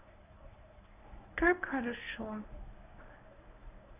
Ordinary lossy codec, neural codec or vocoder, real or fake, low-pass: none; codec, 24 kHz, 0.9 kbps, WavTokenizer, medium speech release version 1; fake; 3.6 kHz